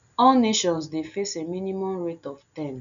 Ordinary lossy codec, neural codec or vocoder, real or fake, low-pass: none; none; real; 7.2 kHz